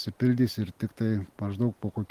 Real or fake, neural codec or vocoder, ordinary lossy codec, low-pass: real; none; Opus, 24 kbps; 14.4 kHz